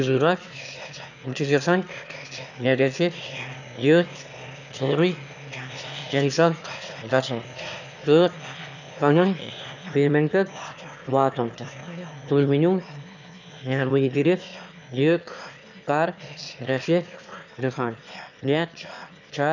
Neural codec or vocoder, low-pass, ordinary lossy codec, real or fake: autoencoder, 22.05 kHz, a latent of 192 numbers a frame, VITS, trained on one speaker; 7.2 kHz; none; fake